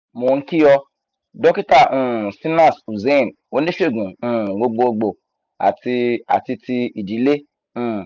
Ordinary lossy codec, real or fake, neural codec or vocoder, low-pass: none; real; none; 7.2 kHz